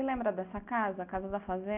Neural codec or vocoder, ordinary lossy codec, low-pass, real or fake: none; none; 3.6 kHz; real